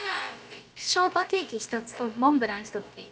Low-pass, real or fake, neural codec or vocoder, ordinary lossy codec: none; fake; codec, 16 kHz, about 1 kbps, DyCAST, with the encoder's durations; none